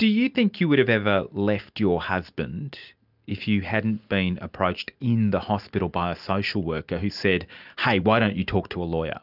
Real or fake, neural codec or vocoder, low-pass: real; none; 5.4 kHz